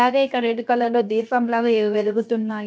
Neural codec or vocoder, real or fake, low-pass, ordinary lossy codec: codec, 16 kHz, 0.7 kbps, FocalCodec; fake; none; none